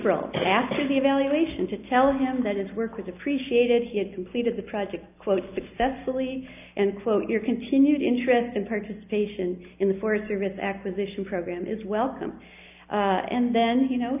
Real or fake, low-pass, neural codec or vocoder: real; 3.6 kHz; none